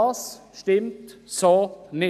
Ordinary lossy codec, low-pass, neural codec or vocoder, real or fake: none; 14.4 kHz; none; real